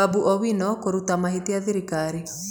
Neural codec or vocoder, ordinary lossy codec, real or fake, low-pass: none; none; real; none